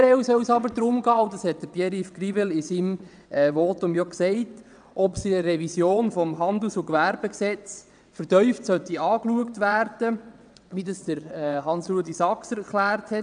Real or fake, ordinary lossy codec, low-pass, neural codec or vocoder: fake; none; 9.9 kHz; vocoder, 22.05 kHz, 80 mel bands, WaveNeXt